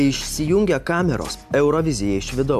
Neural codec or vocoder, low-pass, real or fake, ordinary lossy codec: none; 14.4 kHz; real; AAC, 96 kbps